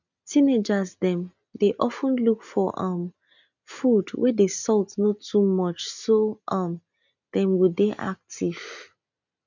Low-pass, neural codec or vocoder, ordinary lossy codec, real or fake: 7.2 kHz; none; none; real